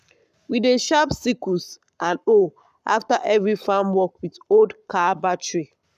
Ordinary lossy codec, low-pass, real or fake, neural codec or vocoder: none; 14.4 kHz; fake; codec, 44.1 kHz, 7.8 kbps, Pupu-Codec